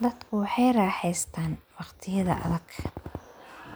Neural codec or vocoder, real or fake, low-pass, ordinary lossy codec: none; real; none; none